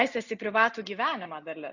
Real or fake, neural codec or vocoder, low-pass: real; none; 7.2 kHz